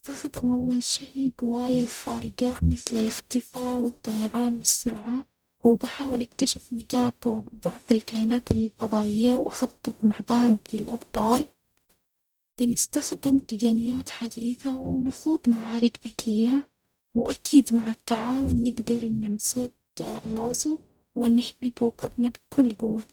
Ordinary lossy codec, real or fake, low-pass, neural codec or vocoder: none; fake; none; codec, 44.1 kHz, 0.9 kbps, DAC